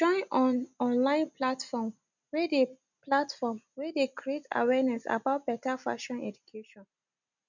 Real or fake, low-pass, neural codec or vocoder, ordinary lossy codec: real; 7.2 kHz; none; none